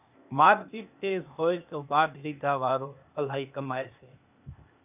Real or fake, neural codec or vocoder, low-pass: fake; codec, 16 kHz, 0.8 kbps, ZipCodec; 3.6 kHz